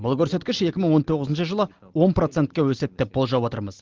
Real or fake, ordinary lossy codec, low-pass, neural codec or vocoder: real; Opus, 16 kbps; 7.2 kHz; none